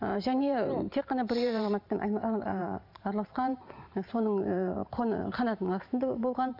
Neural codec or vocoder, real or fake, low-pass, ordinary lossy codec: vocoder, 44.1 kHz, 128 mel bands every 512 samples, BigVGAN v2; fake; 5.4 kHz; none